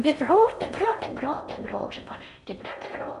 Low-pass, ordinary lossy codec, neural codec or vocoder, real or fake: 10.8 kHz; none; codec, 16 kHz in and 24 kHz out, 0.6 kbps, FocalCodec, streaming, 4096 codes; fake